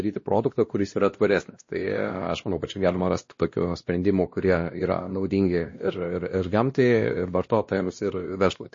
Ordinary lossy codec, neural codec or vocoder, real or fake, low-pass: MP3, 32 kbps; codec, 16 kHz, 1 kbps, X-Codec, WavLM features, trained on Multilingual LibriSpeech; fake; 7.2 kHz